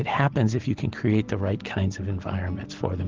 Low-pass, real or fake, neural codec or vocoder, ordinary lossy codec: 7.2 kHz; real; none; Opus, 16 kbps